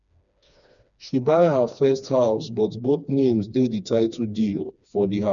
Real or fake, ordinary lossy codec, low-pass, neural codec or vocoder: fake; none; 7.2 kHz; codec, 16 kHz, 2 kbps, FreqCodec, smaller model